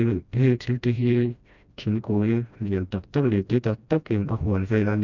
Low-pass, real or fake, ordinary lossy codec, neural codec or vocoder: 7.2 kHz; fake; none; codec, 16 kHz, 1 kbps, FreqCodec, smaller model